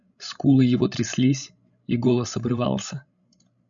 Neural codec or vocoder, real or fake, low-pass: codec, 16 kHz, 16 kbps, FreqCodec, larger model; fake; 7.2 kHz